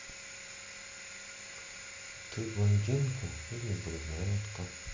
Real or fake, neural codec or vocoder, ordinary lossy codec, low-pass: real; none; MP3, 64 kbps; 7.2 kHz